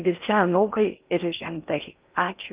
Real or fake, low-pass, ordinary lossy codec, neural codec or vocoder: fake; 3.6 kHz; Opus, 24 kbps; codec, 16 kHz in and 24 kHz out, 0.6 kbps, FocalCodec, streaming, 4096 codes